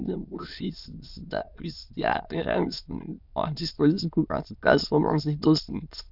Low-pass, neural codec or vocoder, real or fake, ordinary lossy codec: 5.4 kHz; autoencoder, 22.05 kHz, a latent of 192 numbers a frame, VITS, trained on many speakers; fake; none